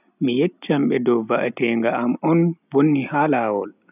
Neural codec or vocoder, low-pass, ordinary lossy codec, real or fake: none; 3.6 kHz; none; real